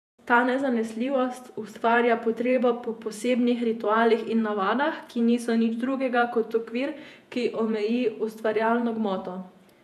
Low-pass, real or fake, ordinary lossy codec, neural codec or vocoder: 14.4 kHz; fake; none; vocoder, 48 kHz, 128 mel bands, Vocos